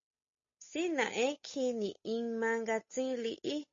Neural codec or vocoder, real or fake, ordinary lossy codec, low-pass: codec, 16 kHz, 8 kbps, FunCodec, trained on Chinese and English, 25 frames a second; fake; MP3, 32 kbps; 7.2 kHz